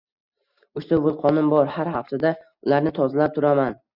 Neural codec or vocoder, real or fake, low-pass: none; real; 5.4 kHz